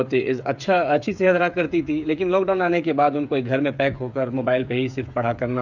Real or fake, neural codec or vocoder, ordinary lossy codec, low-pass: fake; codec, 16 kHz, 8 kbps, FreqCodec, smaller model; none; 7.2 kHz